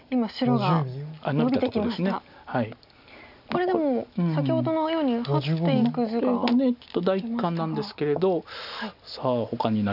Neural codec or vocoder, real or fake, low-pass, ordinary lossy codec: none; real; 5.4 kHz; none